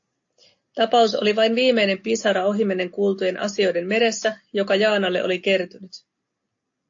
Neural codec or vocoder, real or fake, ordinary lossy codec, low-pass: none; real; AAC, 48 kbps; 7.2 kHz